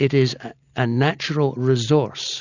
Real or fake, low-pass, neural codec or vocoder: real; 7.2 kHz; none